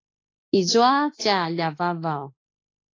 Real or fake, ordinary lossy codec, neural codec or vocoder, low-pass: fake; AAC, 32 kbps; autoencoder, 48 kHz, 32 numbers a frame, DAC-VAE, trained on Japanese speech; 7.2 kHz